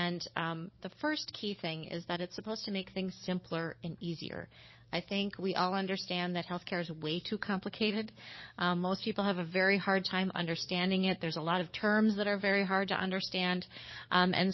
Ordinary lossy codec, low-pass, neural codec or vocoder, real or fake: MP3, 24 kbps; 7.2 kHz; codec, 44.1 kHz, 7.8 kbps, DAC; fake